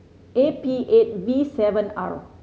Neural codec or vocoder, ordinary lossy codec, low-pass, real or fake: none; none; none; real